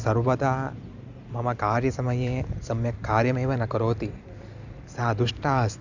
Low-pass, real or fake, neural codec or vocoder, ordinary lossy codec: 7.2 kHz; fake; vocoder, 44.1 kHz, 128 mel bands every 256 samples, BigVGAN v2; none